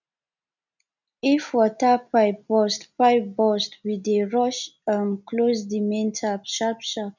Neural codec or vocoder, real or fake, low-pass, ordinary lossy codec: none; real; 7.2 kHz; none